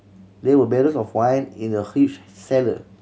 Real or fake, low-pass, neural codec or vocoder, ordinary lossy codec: real; none; none; none